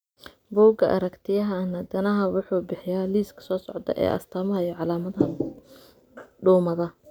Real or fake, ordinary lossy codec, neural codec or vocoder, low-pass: real; none; none; none